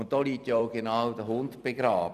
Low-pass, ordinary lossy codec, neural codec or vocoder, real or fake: 14.4 kHz; none; vocoder, 44.1 kHz, 128 mel bands every 256 samples, BigVGAN v2; fake